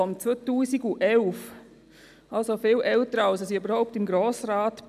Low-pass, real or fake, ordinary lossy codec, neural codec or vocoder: 14.4 kHz; real; none; none